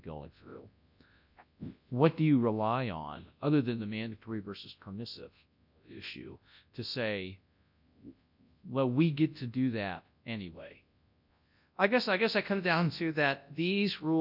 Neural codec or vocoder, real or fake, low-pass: codec, 24 kHz, 0.9 kbps, WavTokenizer, large speech release; fake; 5.4 kHz